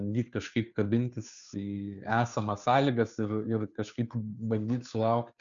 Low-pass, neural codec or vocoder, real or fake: 7.2 kHz; codec, 16 kHz, 2 kbps, FunCodec, trained on Chinese and English, 25 frames a second; fake